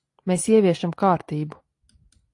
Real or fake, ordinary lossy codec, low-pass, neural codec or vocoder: real; AAC, 48 kbps; 10.8 kHz; none